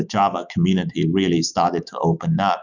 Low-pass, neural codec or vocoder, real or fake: 7.2 kHz; autoencoder, 48 kHz, 128 numbers a frame, DAC-VAE, trained on Japanese speech; fake